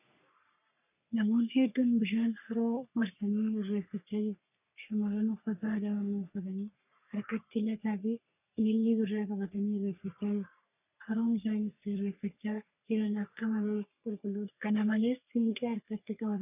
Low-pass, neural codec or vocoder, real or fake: 3.6 kHz; codec, 44.1 kHz, 3.4 kbps, Pupu-Codec; fake